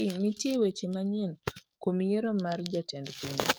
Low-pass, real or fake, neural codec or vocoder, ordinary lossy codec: none; fake; codec, 44.1 kHz, 7.8 kbps, DAC; none